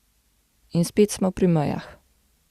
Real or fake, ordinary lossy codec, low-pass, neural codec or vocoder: real; Opus, 64 kbps; 14.4 kHz; none